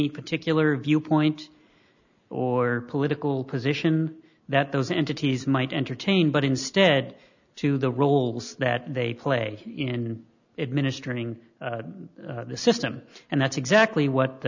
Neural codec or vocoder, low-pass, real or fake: none; 7.2 kHz; real